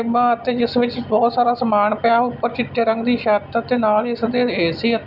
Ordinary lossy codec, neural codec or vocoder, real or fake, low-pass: none; none; real; 5.4 kHz